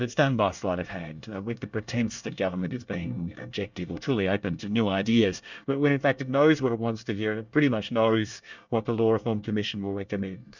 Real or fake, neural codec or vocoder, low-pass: fake; codec, 24 kHz, 1 kbps, SNAC; 7.2 kHz